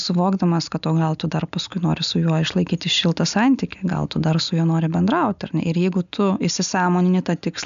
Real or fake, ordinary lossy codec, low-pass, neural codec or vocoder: real; AAC, 96 kbps; 7.2 kHz; none